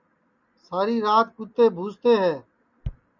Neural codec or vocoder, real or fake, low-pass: none; real; 7.2 kHz